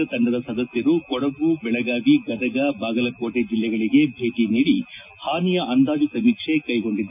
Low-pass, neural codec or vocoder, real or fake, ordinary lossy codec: 3.6 kHz; none; real; none